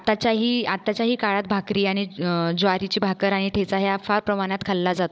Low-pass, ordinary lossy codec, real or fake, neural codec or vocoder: none; none; fake; codec, 16 kHz, 16 kbps, FunCodec, trained on Chinese and English, 50 frames a second